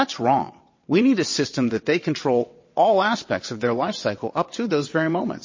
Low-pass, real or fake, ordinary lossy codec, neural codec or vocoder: 7.2 kHz; fake; MP3, 32 kbps; vocoder, 22.05 kHz, 80 mel bands, WaveNeXt